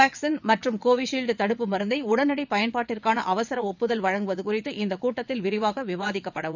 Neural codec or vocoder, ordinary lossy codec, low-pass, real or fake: vocoder, 22.05 kHz, 80 mel bands, WaveNeXt; none; 7.2 kHz; fake